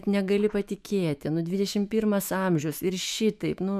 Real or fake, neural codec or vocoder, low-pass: fake; autoencoder, 48 kHz, 128 numbers a frame, DAC-VAE, trained on Japanese speech; 14.4 kHz